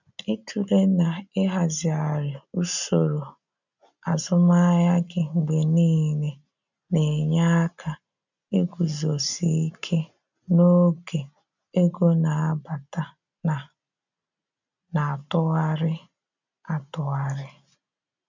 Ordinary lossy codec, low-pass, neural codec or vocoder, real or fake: none; 7.2 kHz; none; real